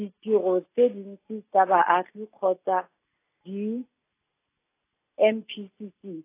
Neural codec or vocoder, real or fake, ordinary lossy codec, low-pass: none; real; AAC, 24 kbps; 3.6 kHz